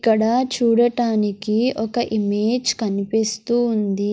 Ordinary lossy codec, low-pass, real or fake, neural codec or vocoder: none; none; real; none